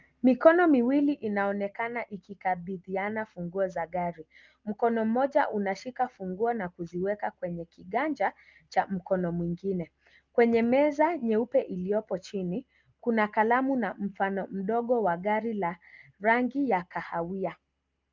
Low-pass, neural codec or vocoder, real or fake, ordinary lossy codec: 7.2 kHz; none; real; Opus, 24 kbps